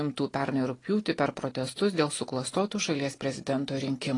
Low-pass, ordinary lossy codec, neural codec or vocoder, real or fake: 10.8 kHz; AAC, 32 kbps; none; real